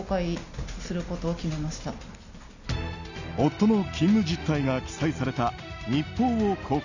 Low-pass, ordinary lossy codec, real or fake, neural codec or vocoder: 7.2 kHz; none; real; none